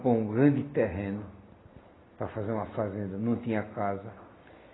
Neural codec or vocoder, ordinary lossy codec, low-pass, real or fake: none; AAC, 16 kbps; 7.2 kHz; real